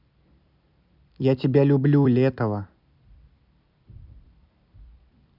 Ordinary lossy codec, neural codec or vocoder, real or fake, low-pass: none; vocoder, 44.1 kHz, 128 mel bands every 256 samples, BigVGAN v2; fake; 5.4 kHz